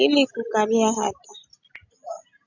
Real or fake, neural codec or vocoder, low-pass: real; none; 7.2 kHz